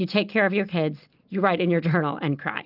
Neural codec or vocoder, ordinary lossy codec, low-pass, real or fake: none; Opus, 24 kbps; 5.4 kHz; real